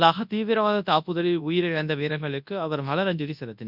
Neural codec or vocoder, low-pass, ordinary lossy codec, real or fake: codec, 24 kHz, 0.9 kbps, WavTokenizer, large speech release; 5.4 kHz; none; fake